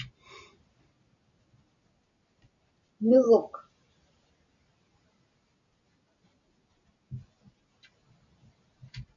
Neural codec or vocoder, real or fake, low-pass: none; real; 7.2 kHz